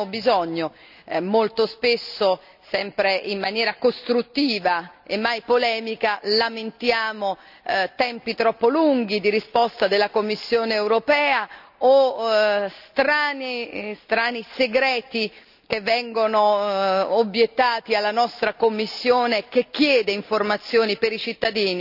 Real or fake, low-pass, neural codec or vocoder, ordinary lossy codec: real; 5.4 kHz; none; none